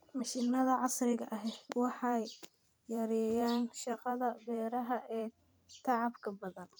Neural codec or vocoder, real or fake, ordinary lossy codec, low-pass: vocoder, 44.1 kHz, 128 mel bands every 512 samples, BigVGAN v2; fake; none; none